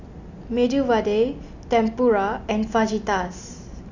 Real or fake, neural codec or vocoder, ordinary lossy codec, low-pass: real; none; none; 7.2 kHz